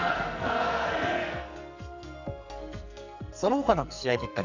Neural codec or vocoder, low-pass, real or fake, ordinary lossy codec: codec, 44.1 kHz, 2.6 kbps, SNAC; 7.2 kHz; fake; none